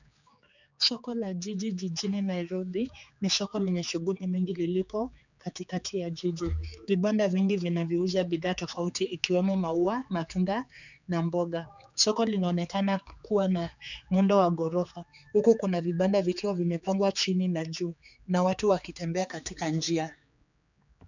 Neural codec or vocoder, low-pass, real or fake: codec, 16 kHz, 4 kbps, X-Codec, HuBERT features, trained on general audio; 7.2 kHz; fake